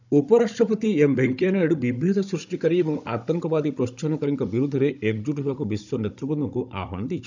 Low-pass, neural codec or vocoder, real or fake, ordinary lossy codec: 7.2 kHz; codec, 16 kHz, 16 kbps, FunCodec, trained on Chinese and English, 50 frames a second; fake; none